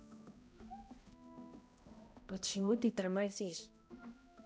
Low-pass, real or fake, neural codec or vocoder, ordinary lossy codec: none; fake; codec, 16 kHz, 0.5 kbps, X-Codec, HuBERT features, trained on balanced general audio; none